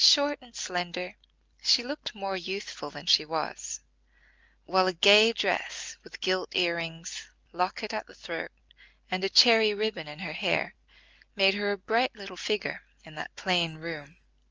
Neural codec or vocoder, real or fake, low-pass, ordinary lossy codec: none; real; 7.2 kHz; Opus, 24 kbps